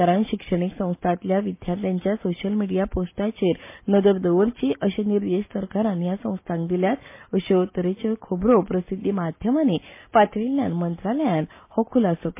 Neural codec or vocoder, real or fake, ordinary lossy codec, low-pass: none; real; MP3, 24 kbps; 3.6 kHz